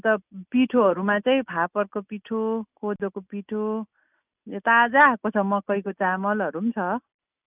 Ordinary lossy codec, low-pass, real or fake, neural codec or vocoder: none; 3.6 kHz; real; none